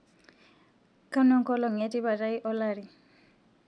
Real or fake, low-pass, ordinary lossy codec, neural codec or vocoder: real; 9.9 kHz; none; none